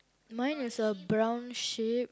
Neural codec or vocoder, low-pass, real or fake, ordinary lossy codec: none; none; real; none